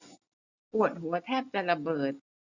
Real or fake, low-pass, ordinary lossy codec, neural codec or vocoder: real; 7.2 kHz; none; none